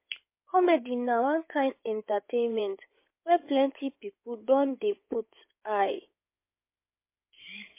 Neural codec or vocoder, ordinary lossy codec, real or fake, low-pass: codec, 16 kHz in and 24 kHz out, 2.2 kbps, FireRedTTS-2 codec; MP3, 24 kbps; fake; 3.6 kHz